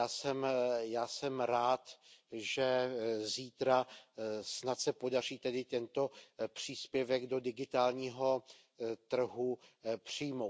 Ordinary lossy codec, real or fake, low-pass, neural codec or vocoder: none; real; none; none